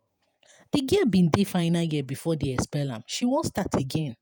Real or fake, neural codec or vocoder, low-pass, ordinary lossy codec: fake; vocoder, 48 kHz, 128 mel bands, Vocos; none; none